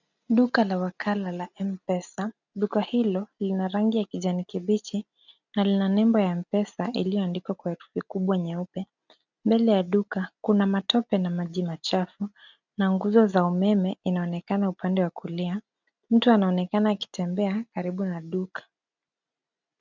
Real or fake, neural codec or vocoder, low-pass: real; none; 7.2 kHz